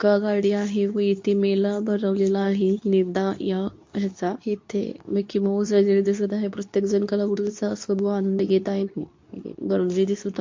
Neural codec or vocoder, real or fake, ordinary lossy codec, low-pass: codec, 24 kHz, 0.9 kbps, WavTokenizer, medium speech release version 2; fake; MP3, 48 kbps; 7.2 kHz